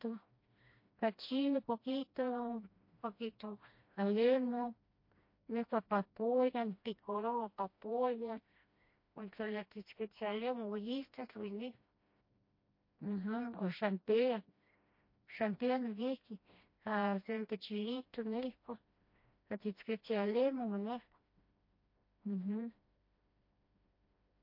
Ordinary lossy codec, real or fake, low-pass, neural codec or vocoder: MP3, 32 kbps; fake; 5.4 kHz; codec, 16 kHz, 1 kbps, FreqCodec, smaller model